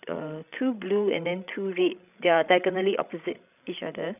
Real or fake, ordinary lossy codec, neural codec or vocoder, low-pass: fake; none; codec, 16 kHz, 16 kbps, FreqCodec, larger model; 3.6 kHz